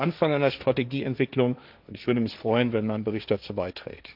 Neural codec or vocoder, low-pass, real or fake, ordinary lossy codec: codec, 16 kHz, 1.1 kbps, Voila-Tokenizer; 5.4 kHz; fake; none